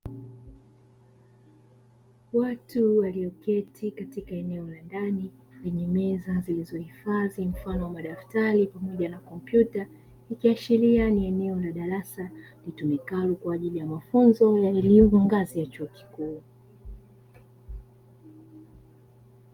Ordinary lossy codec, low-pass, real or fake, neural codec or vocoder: Opus, 32 kbps; 19.8 kHz; real; none